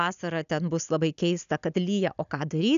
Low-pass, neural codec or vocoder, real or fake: 7.2 kHz; none; real